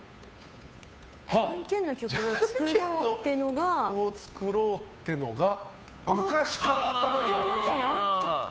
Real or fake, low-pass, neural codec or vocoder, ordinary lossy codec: fake; none; codec, 16 kHz, 2 kbps, FunCodec, trained on Chinese and English, 25 frames a second; none